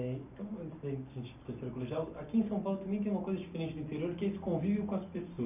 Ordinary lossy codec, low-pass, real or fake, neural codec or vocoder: none; 3.6 kHz; real; none